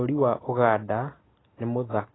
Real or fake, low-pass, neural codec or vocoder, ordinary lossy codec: real; 7.2 kHz; none; AAC, 16 kbps